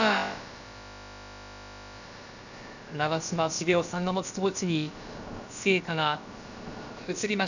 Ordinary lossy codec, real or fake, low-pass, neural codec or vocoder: none; fake; 7.2 kHz; codec, 16 kHz, about 1 kbps, DyCAST, with the encoder's durations